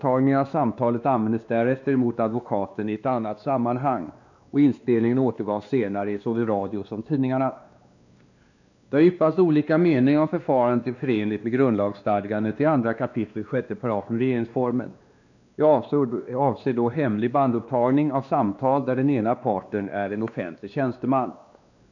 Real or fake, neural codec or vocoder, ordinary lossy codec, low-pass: fake; codec, 16 kHz, 2 kbps, X-Codec, WavLM features, trained on Multilingual LibriSpeech; none; 7.2 kHz